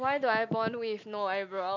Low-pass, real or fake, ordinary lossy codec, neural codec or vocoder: 7.2 kHz; real; none; none